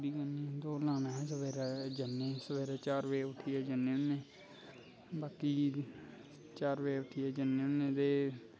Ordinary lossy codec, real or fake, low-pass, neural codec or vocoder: none; real; none; none